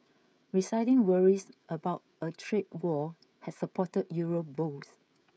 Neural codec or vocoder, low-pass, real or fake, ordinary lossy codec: codec, 16 kHz, 16 kbps, FreqCodec, smaller model; none; fake; none